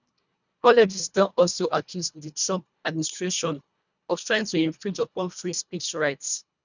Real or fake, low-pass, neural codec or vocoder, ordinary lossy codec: fake; 7.2 kHz; codec, 24 kHz, 1.5 kbps, HILCodec; none